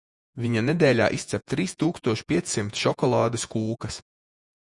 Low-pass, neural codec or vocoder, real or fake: 10.8 kHz; vocoder, 48 kHz, 128 mel bands, Vocos; fake